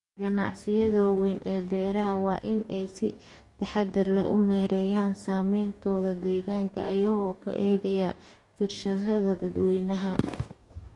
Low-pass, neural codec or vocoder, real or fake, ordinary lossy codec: 10.8 kHz; codec, 44.1 kHz, 2.6 kbps, DAC; fake; MP3, 48 kbps